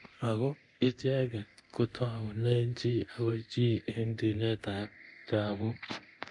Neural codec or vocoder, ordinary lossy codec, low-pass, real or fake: codec, 24 kHz, 0.9 kbps, DualCodec; none; 10.8 kHz; fake